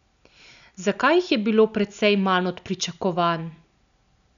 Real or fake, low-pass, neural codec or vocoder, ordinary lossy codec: real; 7.2 kHz; none; none